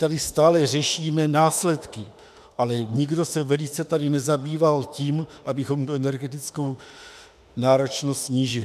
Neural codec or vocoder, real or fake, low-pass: autoencoder, 48 kHz, 32 numbers a frame, DAC-VAE, trained on Japanese speech; fake; 14.4 kHz